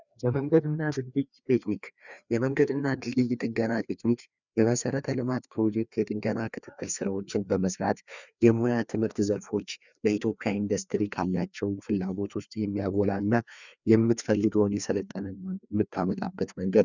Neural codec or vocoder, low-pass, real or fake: codec, 16 kHz, 2 kbps, FreqCodec, larger model; 7.2 kHz; fake